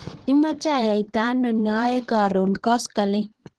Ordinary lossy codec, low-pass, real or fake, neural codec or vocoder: Opus, 16 kbps; 10.8 kHz; fake; codec, 24 kHz, 1 kbps, SNAC